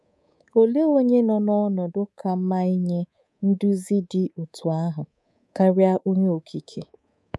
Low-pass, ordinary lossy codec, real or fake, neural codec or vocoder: none; none; fake; codec, 24 kHz, 3.1 kbps, DualCodec